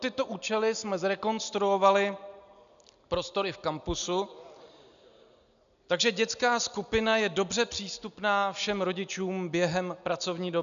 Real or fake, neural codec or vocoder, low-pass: real; none; 7.2 kHz